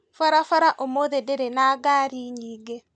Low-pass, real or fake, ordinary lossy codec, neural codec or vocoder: 9.9 kHz; real; none; none